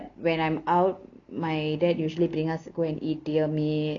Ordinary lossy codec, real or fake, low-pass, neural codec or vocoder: none; real; 7.2 kHz; none